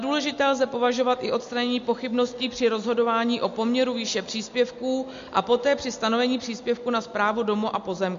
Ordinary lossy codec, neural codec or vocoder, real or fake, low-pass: MP3, 48 kbps; none; real; 7.2 kHz